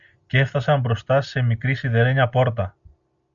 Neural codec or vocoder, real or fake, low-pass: none; real; 7.2 kHz